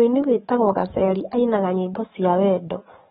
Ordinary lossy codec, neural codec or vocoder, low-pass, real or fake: AAC, 16 kbps; codec, 44.1 kHz, 7.8 kbps, DAC; 19.8 kHz; fake